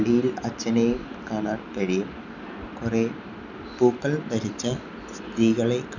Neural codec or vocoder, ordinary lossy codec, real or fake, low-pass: none; none; real; 7.2 kHz